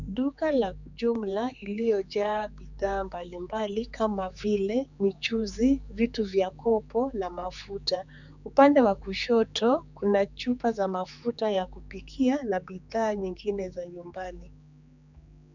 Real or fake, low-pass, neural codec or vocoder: fake; 7.2 kHz; codec, 16 kHz, 4 kbps, X-Codec, HuBERT features, trained on general audio